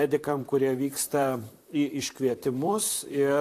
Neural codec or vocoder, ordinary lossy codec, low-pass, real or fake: vocoder, 44.1 kHz, 128 mel bands, Pupu-Vocoder; AAC, 64 kbps; 14.4 kHz; fake